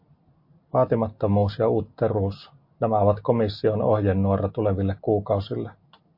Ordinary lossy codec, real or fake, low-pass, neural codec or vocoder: MP3, 32 kbps; real; 5.4 kHz; none